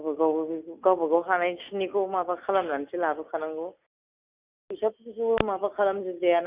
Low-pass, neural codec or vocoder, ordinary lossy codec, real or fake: 3.6 kHz; none; Opus, 64 kbps; real